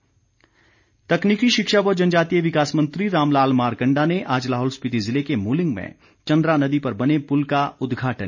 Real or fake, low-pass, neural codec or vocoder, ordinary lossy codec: real; 7.2 kHz; none; none